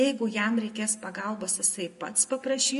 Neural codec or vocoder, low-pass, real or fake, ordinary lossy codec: none; 14.4 kHz; real; MP3, 48 kbps